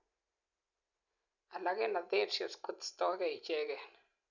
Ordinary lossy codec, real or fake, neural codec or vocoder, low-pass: none; real; none; 7.2 kHz